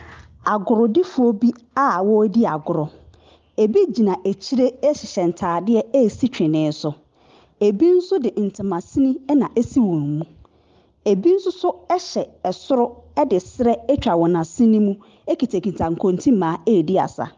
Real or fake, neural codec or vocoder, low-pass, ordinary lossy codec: real; none; 7.2 kHz; Opus, 32 kbps